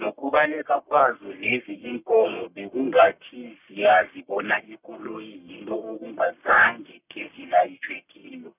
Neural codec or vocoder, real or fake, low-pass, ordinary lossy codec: codec, 44.1 kHz, 1.7 kbps, Pupu-Codec; fake; 3.6 kHz; none